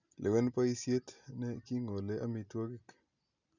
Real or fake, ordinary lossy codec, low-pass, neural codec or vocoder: real; none; 7.2 kHz; none